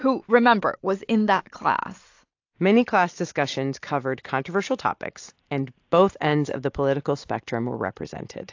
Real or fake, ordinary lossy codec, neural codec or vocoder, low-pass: real; AAC, 48 kbps; none; 7.2 kHz